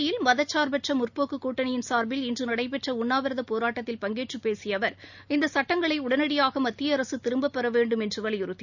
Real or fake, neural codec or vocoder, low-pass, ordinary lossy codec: real; none; 7.2 kHz; none